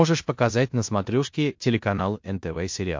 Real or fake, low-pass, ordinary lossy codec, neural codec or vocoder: fake; 7.2 kHz; MP3, 48 kbps; codec, 16 kHz in and 24 kHz out, 0.9 kbps, LongCat-Audio-Codec, fine tuned four codebook decoder